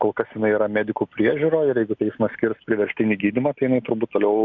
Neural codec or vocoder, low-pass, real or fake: none; 7.2 kHz; real